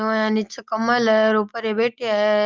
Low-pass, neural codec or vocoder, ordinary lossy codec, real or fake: 7.2 kHz; none; Opus, 32 kbps; real